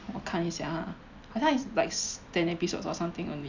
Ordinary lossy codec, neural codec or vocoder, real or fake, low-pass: none; none; real; 7.2 kHz